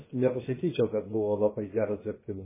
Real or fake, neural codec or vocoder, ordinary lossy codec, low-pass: fake; codec, 16 kHz, about 1 kbps, DyCAST, with the encoder's durations; MP3, 16 kbps; 3.6 kHz